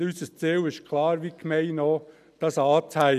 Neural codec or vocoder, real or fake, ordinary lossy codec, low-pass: none; real; none; 14.4 kHz